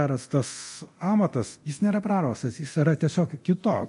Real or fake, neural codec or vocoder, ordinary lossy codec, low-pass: fake; codec, 24 kHz, 0.9 kbps, DualCodec; MP3, 48 kbps; 10.8 kHz